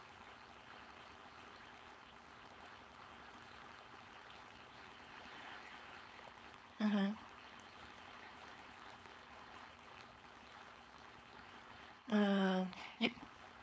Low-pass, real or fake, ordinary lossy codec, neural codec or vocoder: none; fake; none; codec, 16 kHz, 4.8 kbps, FACodec